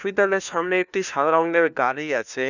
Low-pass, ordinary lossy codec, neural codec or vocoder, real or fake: 7.2 kHz; none; codec, 16 kHz, 1 kbps, FunCodec, trained on LibriTTS, 50 frames a second; fake